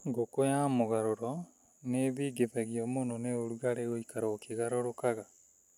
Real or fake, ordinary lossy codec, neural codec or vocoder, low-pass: real; none; none; 19.8 kHz